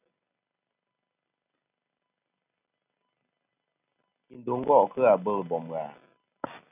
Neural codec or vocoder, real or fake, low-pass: none; real; 3.6 kHz